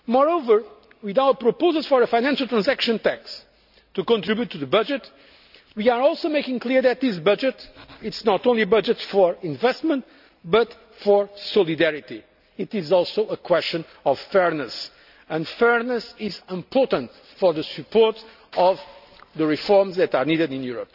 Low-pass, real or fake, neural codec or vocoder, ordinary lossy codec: 5.4 kHz; real; none; none